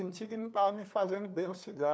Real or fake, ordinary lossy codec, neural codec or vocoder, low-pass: fake; none; codec, 16 kHz, 2 kbps, FunCodec, trained on LibriTTS, 25 frames a second; none